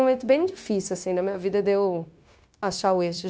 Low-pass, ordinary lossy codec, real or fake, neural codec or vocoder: none; none; fake; codec, 16 kHz, 0.9 kbps, LongCat-Audio-Codec